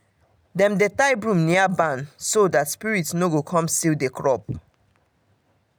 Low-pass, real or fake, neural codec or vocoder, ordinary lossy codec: none; real; none; none